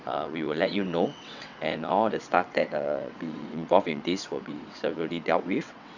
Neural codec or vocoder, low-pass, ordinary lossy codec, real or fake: vocoder, 22.05 kHz, 80 mel bands, WaveNeXt; 7.2 kHz; none; fake